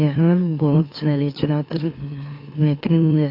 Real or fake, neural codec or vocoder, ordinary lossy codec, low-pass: fake; autoencoder, 44.1 kHz, a latent of 192 numbers a frame, MeloTTS; AAC, 32 kbps; 5.4 kHz